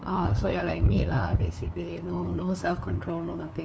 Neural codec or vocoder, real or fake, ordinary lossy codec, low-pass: codec, 16 kHz, 2 kbps, FunCodec, trained on LibriTTS, 25 frames a second; fake; none; none